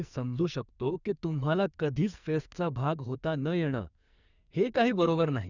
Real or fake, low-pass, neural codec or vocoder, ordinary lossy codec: fake; 7.2 kHz; codec, 44.1 kHz, 2.6 kbps, SNAC; none